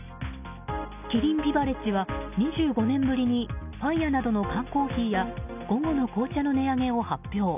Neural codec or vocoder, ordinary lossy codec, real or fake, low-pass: none; none; real; 3.6 kHz